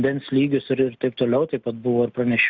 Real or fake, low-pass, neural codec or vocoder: real; 7.2 kHz; none